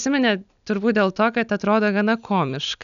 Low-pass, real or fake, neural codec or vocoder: 7.2 kHz; real; none